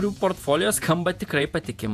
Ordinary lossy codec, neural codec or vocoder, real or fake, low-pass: AAC, 96 kbps; vocoder, 48 kHz, 128 mel bands, Vocos; fake; 14.4 kHz